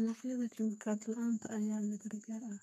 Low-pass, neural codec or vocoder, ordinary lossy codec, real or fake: 14.4 kHz; codec, 32 kHz, 1.9 kbps, SNAC; none; fake